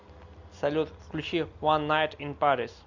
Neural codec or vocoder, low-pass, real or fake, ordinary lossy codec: none; 7.2 kHz; real; MP3, 64 kbps